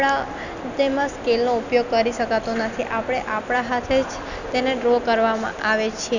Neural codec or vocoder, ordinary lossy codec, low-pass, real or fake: none; none; 7.2 kHz; real